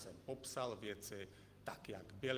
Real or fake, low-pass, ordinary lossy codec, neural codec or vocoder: fake; 14.4 kHz; Opus, 24 kbps; vocoder, 44.1 kHz, 128 mel bands every 512 samples, BigVGAN v2